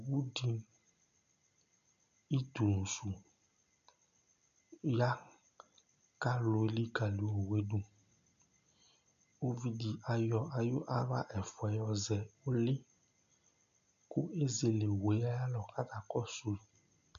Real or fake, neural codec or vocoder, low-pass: real; none; 7.2 kHz